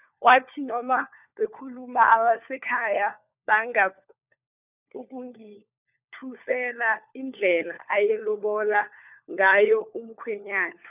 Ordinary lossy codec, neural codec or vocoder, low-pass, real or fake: none; codec, 16 kHz, 4 kbps, FunCodec, trained on LibriTTS, 50 frames a second; 3.6 kHz; fake